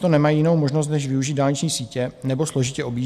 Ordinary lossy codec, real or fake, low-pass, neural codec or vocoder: AAC, 96 kbps; real; 14.4 kHz; none